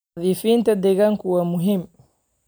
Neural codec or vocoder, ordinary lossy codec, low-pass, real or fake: none; none; none; real